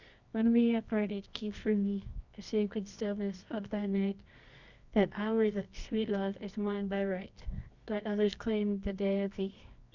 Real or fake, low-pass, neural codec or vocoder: fake; 7.2 kHz; codec, 24 kHz, 0.9 kbps, WavTokenizer, medium music audio release